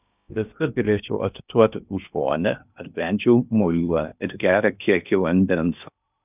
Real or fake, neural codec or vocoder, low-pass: fake; codec, 16 kHz in and 24 kHz out, 0.6 kbps, FocalCodec, streaming, 2048 codes; 3.6 kHz